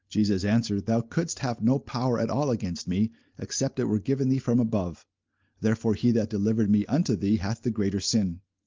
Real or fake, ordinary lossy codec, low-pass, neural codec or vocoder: real; Opus, 24 kbps; 7.2 kHz; none